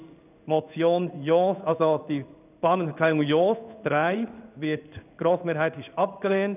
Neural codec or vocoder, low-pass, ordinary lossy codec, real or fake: codec, 16 kHz in and 24 kHz out, 1 kbps, XY-Tokenizer; 3.6 kHz; none; fake